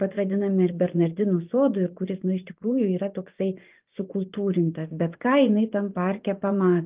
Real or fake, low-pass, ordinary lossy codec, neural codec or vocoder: fake; 3.6 kHz; Opus, 24 kbps; vocoder, 24 kHz, 100 mel bands, Vocos